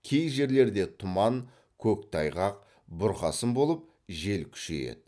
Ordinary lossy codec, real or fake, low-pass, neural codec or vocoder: none; real; none; none